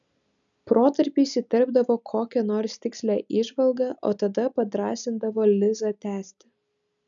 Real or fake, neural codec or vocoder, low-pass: real; none; 7.2 kHz